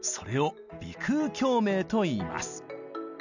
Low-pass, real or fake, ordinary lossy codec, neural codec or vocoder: 7.2 kHz; real; none; none